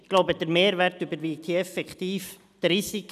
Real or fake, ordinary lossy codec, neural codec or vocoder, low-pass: real; none; none; 14.4 kHz